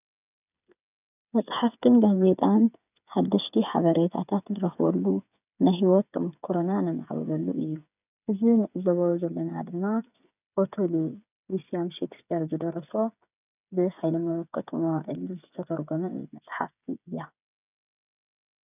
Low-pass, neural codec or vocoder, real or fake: 3.6 kHz; codec, 16 kHz, 8 kbps, FreqCodec, smaller model; fake